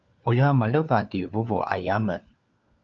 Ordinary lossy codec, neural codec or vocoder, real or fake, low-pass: Opus, 24 kbps; codec, 16 kHz, 4 kbps, FunCodec, trained on LibriTTS, 50 frames a second; fake; 7.2 kHz